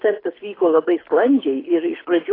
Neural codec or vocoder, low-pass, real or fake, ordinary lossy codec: codec, 24 kHz, 6 kbps, HILCodec; 5.4 kHz; fake; AAC, 24 kbps